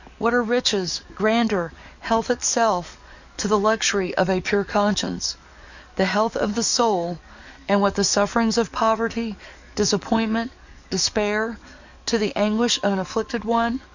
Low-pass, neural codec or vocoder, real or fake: 7.2 kHz; codec, 44.1 kHz, 7.8 kbps, DAC; fake